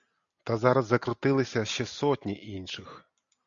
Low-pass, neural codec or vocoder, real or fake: 7.2 kHz; none; real